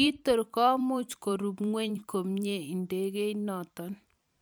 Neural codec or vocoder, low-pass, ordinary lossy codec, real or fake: vocoder, 44.1 kHz, 128 mel bands every 512 samples, BigVGAN v2; none; none; fake